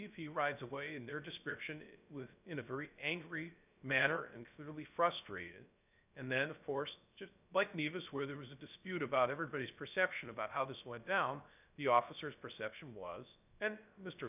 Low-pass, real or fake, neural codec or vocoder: 3.6 kHz; fake; codec, 16 kHz, 0.3 kbps, FocalCodec